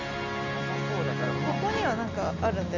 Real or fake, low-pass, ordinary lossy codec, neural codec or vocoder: real; 7.2 kHz; none; none